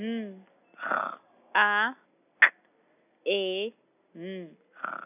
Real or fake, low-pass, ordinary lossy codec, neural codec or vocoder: real; 3.6 kHz; none; none